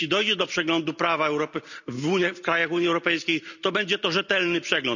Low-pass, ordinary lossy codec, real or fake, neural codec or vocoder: 7.2 kHz; none; real; none